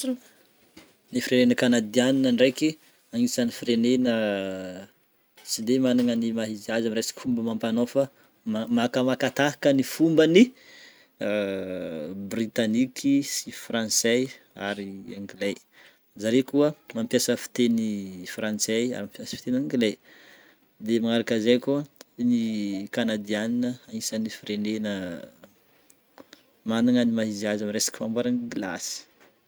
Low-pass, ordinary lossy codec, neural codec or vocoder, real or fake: none; none; none; real